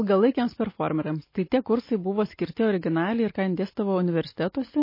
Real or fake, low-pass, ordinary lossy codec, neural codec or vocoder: real; 5.4 kHz; MP3, 24 kbps; none